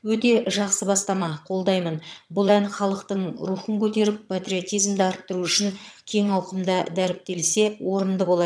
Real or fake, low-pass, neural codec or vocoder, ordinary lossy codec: fake; none; vocoder, 22.05 kHz, 80 mel bands, HiFi-GAN; none